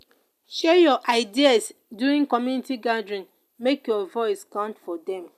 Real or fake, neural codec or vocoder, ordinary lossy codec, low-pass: real; none; none; 14.4 kHz